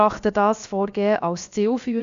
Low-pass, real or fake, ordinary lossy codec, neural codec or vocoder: 7.2 kHz; fake; Opus, 64 kbps; codec, 16 kHz, about 1 kbps, DyCAST, with the encoder's durations